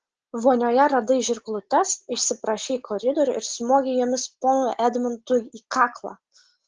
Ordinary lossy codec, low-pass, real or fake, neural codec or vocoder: Opus, 16 kbps; 7.2 kHz; real; none